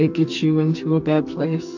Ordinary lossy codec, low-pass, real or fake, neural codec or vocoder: AAC, 48 kbps; 7.2 kHz; fake; codec, 44.1 kHz, 2.6 kbps, SNAC